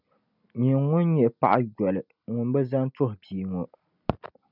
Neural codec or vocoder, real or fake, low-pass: none; real; 5.4 kHz